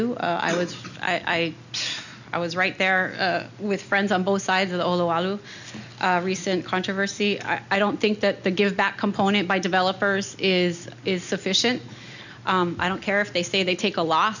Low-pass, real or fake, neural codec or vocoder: 7.2 kHz; real; none